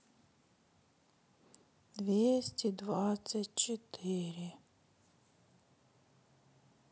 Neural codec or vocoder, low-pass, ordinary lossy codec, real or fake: none; none; none; real